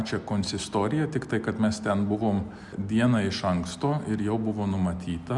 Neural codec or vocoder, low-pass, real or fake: none; 10.8 kHz; real